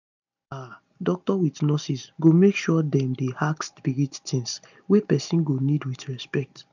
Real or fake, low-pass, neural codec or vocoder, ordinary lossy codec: real; 7.2 kHz; none; none